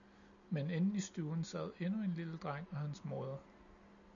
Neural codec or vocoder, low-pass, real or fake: none; 7.2 kHz; real